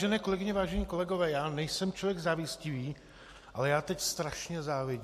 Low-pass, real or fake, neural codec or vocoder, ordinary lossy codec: 14.4 kHz; real; none; MP3, 64 kbps